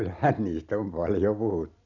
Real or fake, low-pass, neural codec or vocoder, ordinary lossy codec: real; 7.2 kHz; none; none